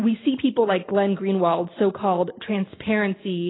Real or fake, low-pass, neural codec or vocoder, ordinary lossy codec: real; 7.2 kHz; none; AAC, 16 kbps